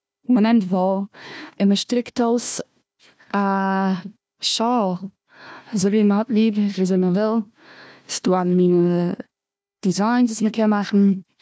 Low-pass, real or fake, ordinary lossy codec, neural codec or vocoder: none; fake; none; codec, 16 kHz, 1 kbps, FunCodec, trained on Chinese and English, 50 frames a second